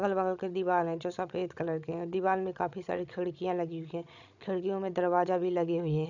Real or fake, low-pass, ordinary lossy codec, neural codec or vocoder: fake; 7.2 kHz; none; codec, 16 kHz, 16 kbps, FreqCodec, larger model